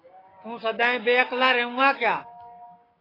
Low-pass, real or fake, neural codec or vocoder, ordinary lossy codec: 5.4 kHz; fake; codec, 16 kHz, 6 kbps, DAC; AAC, 24 kbps